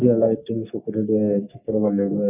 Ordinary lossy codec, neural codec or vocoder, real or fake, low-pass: none; codec, 44.1 kHz, 3.4 kbps, Pupu-Codec; fake; 3.6 kHz